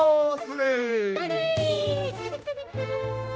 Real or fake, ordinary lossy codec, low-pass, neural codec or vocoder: fake; none; none; codec, 16 kHz, 1 kbps, X-Codec, HuBERT features, trained on general audio